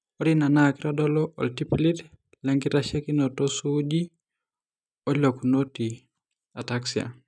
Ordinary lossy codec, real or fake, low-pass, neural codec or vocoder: none; real; none; none